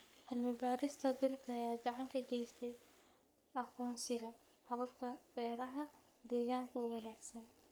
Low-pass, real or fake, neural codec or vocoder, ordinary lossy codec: none; fake; codec, 44.1 kHz, 3.4 kbps, Pupu-Codec; none